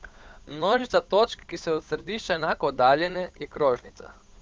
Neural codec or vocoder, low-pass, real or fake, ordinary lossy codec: codec, 16 kHz, 8 kbps, FunCodec, trained on Chinese and English, 25 frames a second; none; fake; none